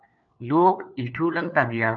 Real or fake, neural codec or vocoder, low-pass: fake; codec, 16 kHz, 4 kbps, FunCodec, trained on LibriTTS, 50 frames a second; 7.2 kHz